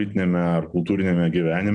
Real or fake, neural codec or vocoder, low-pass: real; none; 9.9 kHz